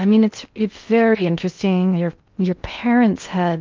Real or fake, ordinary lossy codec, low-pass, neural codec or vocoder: fake; Opus, 24 kbps; 7.2 kHz; codec, 16 kHz in and 24 kHz out, 0.6 kbps, FocalCodec, streaming, 2048 codes